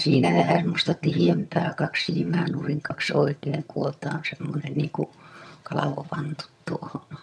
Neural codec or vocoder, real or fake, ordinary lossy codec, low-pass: vocoder, 22.05 kHz, 80 mel bands, HiFi-GAN; fake; none; none